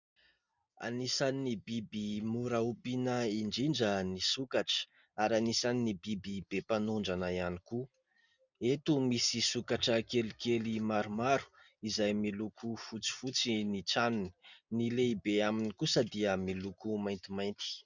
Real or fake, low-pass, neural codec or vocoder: real; 7.2 kHz; none